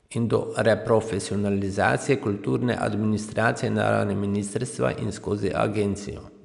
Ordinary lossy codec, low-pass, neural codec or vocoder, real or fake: none; 10.8 kHz; none; real